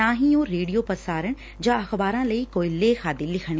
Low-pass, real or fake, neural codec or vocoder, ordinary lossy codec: none; real; none; none